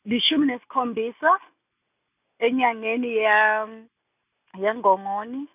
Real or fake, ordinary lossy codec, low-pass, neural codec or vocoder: real; none; 3.6 kHz; none